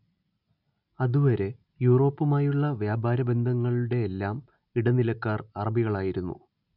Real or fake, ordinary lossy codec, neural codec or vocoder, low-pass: real; none; none; 5.4 kHz